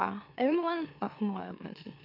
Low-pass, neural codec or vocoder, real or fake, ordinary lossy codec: 5.4 kHz; autoencoder, 44.1 kHz, a latent of 192 numbers a frame, MeloTTS; fake; none